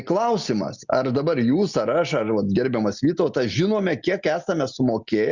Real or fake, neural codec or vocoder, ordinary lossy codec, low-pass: real; none; Opus, 64 kbps; 7.2 kHz